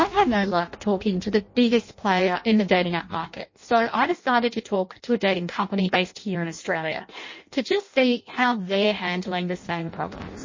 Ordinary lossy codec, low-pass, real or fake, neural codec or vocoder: MP3, 32 kbps; 7.2 kHz; fake; codec, 16 kHz in and 24 kHz out, 0.6 kbps, FireRedTTS-2 codec